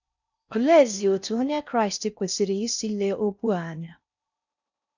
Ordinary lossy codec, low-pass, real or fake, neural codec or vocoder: none; 7.2 kHz; fake; codec, 16 kHz in and 24 kHz out, 0.6 kbps, FocalCodec, streaming, 4096 codes